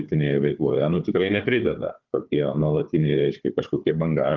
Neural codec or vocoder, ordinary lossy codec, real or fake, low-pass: codec, 16 kHz, 4 kbps, FunCodec, trained on Chinese and English, 50 frames a second; Opus, 32 kbps; fake; 7.2 kHz